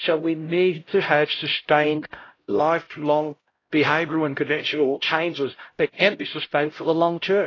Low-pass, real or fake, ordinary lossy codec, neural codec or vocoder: 7.2 kHz; fake; AAC, 32 kbps; codec, 16 kHz, 0.5 kbps, X-Codec, HuBERT features, trained on LibriSpeech